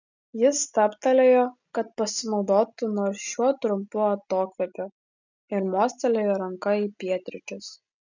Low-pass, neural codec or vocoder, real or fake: 7.2 kHz; none; real